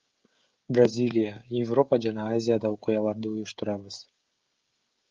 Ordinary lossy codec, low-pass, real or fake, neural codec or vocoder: Opus, 32 kbps; 7.2 kHz; fake; codec, 16 kHz, 16 kbps, FreqCodec, smaller model